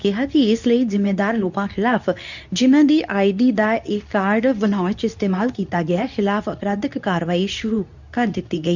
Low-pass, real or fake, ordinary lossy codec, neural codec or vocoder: 7.2 kHz; fake; none; codec, 24 kHz, 0.9 kbps, WavTokenizer, medium speech release version 2